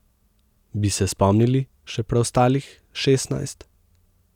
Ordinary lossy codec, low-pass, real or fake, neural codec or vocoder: none; 19.8 kHz; real; none